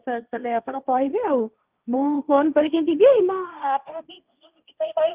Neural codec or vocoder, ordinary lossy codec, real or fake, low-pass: codec, 16 kHz, 1.1 kbps, Voila-Tokenizer; Opus, 24 kbps; fake; 3.6 kHz